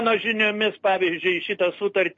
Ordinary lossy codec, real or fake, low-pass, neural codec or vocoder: MP3, 32 kbps; real; 7.2 kHz; none